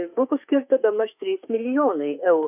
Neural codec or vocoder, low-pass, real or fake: autoencoder, 48 kHz, 32 numbers a frame, DAC-VAE, trained on Japanese speech; 3.6 kHz; fake